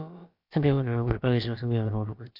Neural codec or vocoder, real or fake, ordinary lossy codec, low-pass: codec, 16 kHz, about 1 kbps, DyCAST, with the encoder's durations; fake; AAC, 48 kbps; 5.4 kHz